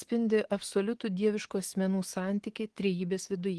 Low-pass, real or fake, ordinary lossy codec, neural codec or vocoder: 10.8 kHz; fake; Opus, 16 kbps; codec, 24 kHz, 3.1 kbps, DualCodec